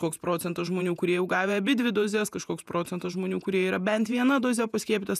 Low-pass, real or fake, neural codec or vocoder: 14.4 kHz; fake; vocoder, 48 kHz, 128 mel bands, Vocos